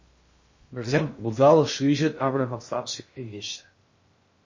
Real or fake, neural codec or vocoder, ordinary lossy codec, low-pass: fake; codec, 16 kHz in and 24 kHz out, 0.6 kbps, FocalCodec, streaming, 2048 codes; MP3, 32 kbps; 7.2 kHz